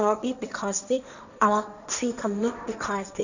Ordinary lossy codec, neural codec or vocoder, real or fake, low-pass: none; codec, 16 kHz, 1.1 kbps, Voila-Tokenizer; fake; 7.2 kHz